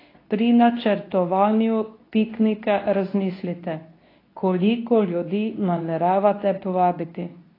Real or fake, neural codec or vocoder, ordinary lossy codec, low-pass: fake; codec, 24 kHz, 0.9 kbps, WavTokenizer, medium speech release version 2; AAC, 24 kbps; 5.4 kHz